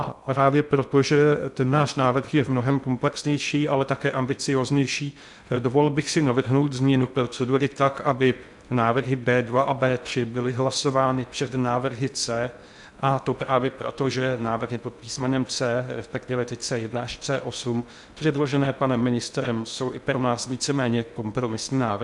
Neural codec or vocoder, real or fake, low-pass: codec, 16 kHz in and 24 kHz out, 0.6 kbps, FocalCodec, streaming, 2048 codes; fake; 10.8 kHz